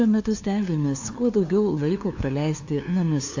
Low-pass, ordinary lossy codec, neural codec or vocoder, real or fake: 7.2 kHz; AAC, 48 kbps; codec, 16 kHz, 2 kbps, FunCodec, trained on LibriTTS, 25 frames a second; fake